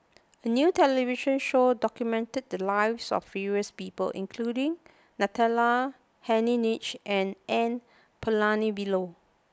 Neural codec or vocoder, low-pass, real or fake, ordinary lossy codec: none; none; real; none